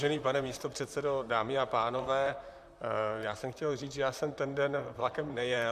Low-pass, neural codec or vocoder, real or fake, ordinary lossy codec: 14.4 kHz; vocoder, 44.1 kHz, 128 mel bands, Pupu-Vocoder; fake; MP3, 96 kbps